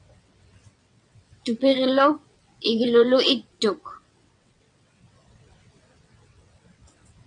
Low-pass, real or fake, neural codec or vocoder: 9.9 kHz; fake; vocoder, 22.05 kHz, 80 mel bands, WaveNeXt